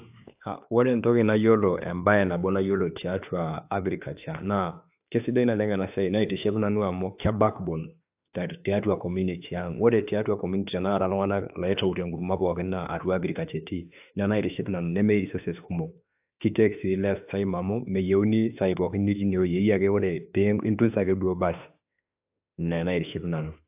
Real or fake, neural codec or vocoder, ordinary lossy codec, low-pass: fake; autoencoder, 48 kHz, 32 numbers a frame, DAC-VAE, trained on Japanese speech; AAC, 32 kbps; 3.6 kHz